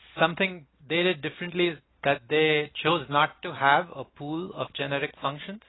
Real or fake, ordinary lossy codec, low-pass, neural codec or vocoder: real; AAC, 16 kbps; 7.2 kHz; none